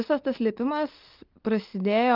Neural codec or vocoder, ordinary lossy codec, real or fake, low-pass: none; Opus, 32 kbps; real; 5.4 kHz